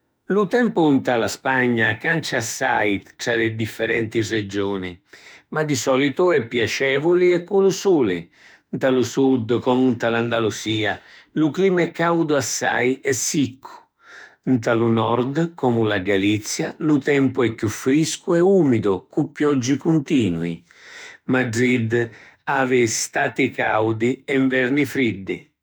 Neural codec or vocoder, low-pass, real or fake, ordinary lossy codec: autoencoder, 48 kHz, 32 numbers a frame, DAC-VAE, trained on Japanese speech; none; fake; none